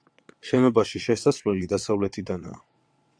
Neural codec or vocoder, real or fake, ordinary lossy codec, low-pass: vocoder, 22.05 kHz, 80 mel bands, WaveNeXt; fake; AAC, 64 kbps; 9.9 kHz